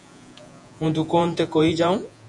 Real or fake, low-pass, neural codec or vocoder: fake; 10.8 kHz; vocoder, 48 kHz, 128 mel bands, Vocos